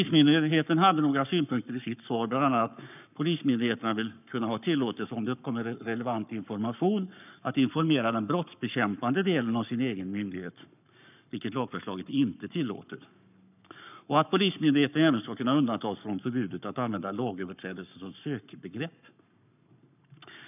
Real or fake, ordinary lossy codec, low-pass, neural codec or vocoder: fake; none; 3.6 kHz; codec, 44.1 kHz, 7.8 kbps, Pupu-Codec